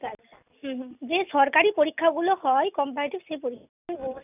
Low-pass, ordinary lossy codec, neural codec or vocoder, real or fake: 3.6 kHz; none; none; real